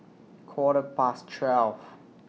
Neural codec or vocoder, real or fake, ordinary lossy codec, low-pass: none; real; none; none